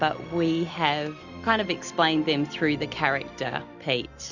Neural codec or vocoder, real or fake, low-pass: none; real; 7.2 kHz